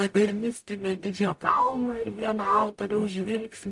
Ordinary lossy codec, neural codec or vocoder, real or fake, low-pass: AAC, 64 kbps; codec, 44.1 kHz, 0.9 kbps, DAC; fake; 10.8 kHz